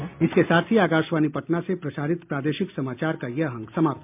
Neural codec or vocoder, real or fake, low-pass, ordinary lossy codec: none; real; 3.6 kHz; MP3, 32 kbps